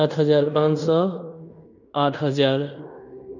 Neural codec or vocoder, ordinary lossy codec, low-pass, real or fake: codec, 16 kHz in and 24 kHz out, 0.9 kbps, LongCat-Audio-Codec, fine tuned four codebook decoder; none; 7.2 kHz; fake